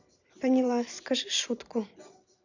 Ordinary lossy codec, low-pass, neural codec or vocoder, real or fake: none; 7.2 kHz; vocoder, 44.1 kHz, 128 mel bands every 512 samples, BigVGAN v2; fake